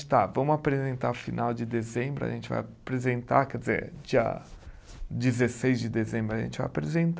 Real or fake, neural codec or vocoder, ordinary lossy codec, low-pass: real; none; none; none